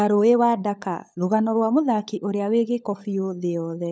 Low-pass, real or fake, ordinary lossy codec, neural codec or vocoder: none; fake; none; codec, 16 kHz, 16 kbps, FunCodec, trained on LibriTTS, 50 frames a second